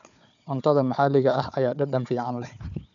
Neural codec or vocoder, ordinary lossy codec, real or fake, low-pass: codec, 16 kHz, 4 kbps, FunCodec, trained on Chinese and English, 50 frames a second; none; fake; 7.2 kHz